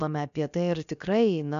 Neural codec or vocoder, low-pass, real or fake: codec, 16 kHz, about 1 kbps, DyCAST, with the encoder's durations; 7.2 kHz; fake